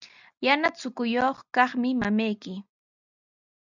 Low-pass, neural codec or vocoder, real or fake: 7.2 kHz; none; real